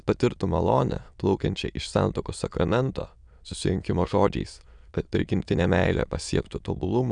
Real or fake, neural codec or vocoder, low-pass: fake; autoencoder, 22.05 kHz, a latent of 192 numbers a frame, VITS, trained on many speakers; 9.9 kHz